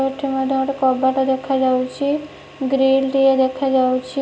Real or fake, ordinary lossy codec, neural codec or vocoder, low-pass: real; none; none; none